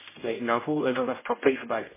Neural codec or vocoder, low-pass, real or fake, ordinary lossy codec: codec, 16 kHz, 0.5 kbps, X-Codec, HuBERT features, trained on general audio; 3.6 kHz; fake; MP3, 16 kbps